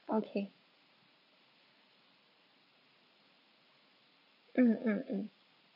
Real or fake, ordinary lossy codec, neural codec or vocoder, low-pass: fake; none; vocoder, 44.1 kHz, 80 mel bands, Vocos; 5.4 kHz